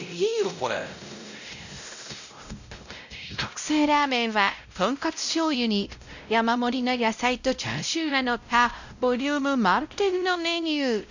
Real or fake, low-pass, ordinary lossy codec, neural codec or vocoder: fake; 7.2 kHz; none; codec, 16 kHz, 0.5 kbps, X-Codec, WavLM features, trained on Multilingual LibriSpeech